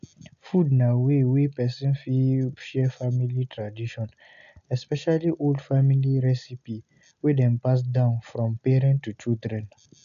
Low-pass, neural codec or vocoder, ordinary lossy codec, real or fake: 7.2 kHz; none; none; real